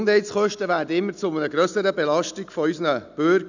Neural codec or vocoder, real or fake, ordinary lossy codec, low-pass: none; real; none; 7.2 kHz